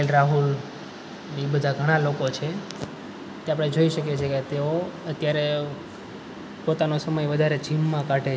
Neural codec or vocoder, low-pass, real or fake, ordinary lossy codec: none; none; real; none